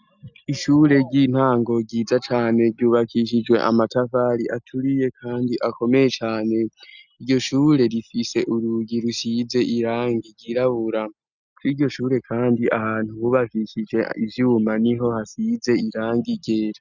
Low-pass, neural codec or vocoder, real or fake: 7.2 kHz; none; real